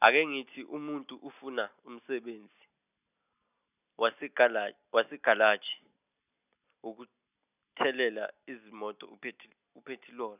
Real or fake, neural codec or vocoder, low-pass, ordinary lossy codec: real; none; 3.6 kHz; none